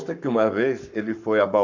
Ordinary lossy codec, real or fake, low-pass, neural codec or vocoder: none; fake; 7.2 kHz; codec, 44.1 kHz, 7.8 kbps, Pupu-Codec